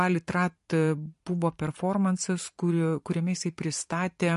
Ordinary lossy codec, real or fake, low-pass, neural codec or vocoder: MP3, 48 kbps; fake; 14.4 kHz; vocoder, 44.1 kHz, 128 mel bands every 512 samples, BigVGAN v2